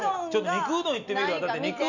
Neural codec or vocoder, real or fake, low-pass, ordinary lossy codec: none; real; 7.2 kHz; none